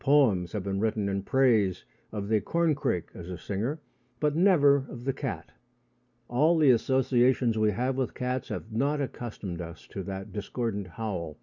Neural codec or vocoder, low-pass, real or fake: none; 7.2 kHz; real